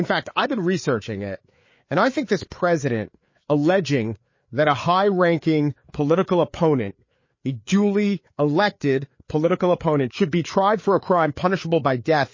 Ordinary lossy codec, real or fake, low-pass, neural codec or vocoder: MP3, 32 kbps; fake; 7.2 kHz; codec, 16 kHz, 4 kbps, FreqCodec, larger model